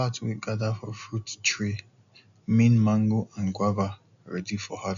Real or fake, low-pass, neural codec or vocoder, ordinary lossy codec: real; 7.2 kHz; none; none